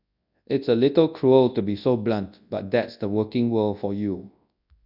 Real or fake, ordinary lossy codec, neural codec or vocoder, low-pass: fake; none; codec, 24 kHz, 0.9 kbps, WavTokenizer, large speech release; 5.4 kHz